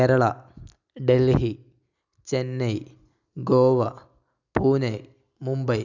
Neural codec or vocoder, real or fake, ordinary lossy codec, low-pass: none; real; none; 7.2 kHz